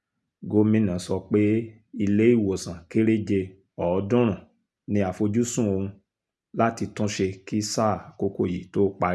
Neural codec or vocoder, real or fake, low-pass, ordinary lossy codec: none; real; none; none